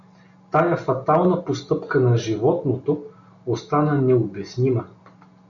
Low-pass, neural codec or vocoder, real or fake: 7.2 kHz; none; real